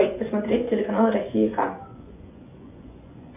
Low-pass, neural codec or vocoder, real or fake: 3.6 kHz; vocoder, 24 kHz, 100 mel bands, Vocos; fake